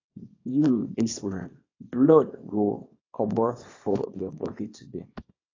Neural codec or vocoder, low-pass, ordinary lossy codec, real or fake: codec, 24 kHz, 0.9 kbps, WavTokenizer, small release; 7.2 kHz; AAC, 32 kbps; fake